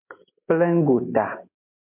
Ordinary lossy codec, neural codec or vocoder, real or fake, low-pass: MP3, 32 kbps; none; real; 3.6 kHz